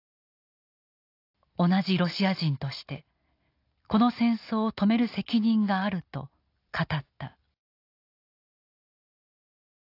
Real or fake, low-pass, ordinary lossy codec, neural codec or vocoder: real; 5.4 kHz; AAC, 32 kbps; none